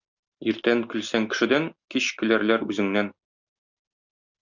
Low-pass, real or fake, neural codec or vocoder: 7.2 kHz; real; none